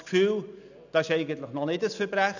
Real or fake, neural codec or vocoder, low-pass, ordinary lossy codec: real; none; 7.2 kHz; none